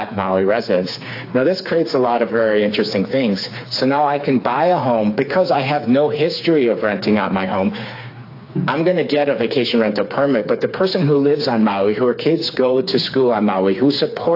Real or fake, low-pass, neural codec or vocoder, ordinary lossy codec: fake; 5.4 kHz; codec, 16 kHz, 4 kbps, FreqCodec, smaller model; AAC, 32 kbps